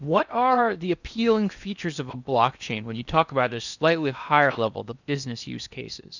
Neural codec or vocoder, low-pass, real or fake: codec, 16 kHz in and 24 kHz out, 0.8 kbps, FocalCodec, streaming, 65536 codes; 7.2 kHz; fake